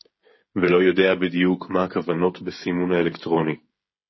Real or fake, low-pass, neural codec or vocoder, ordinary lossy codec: fake; 7.2 kHz; codec, 16 kHz, 16 kbps, FreqCodec, smaller model; MP3, 24 kbps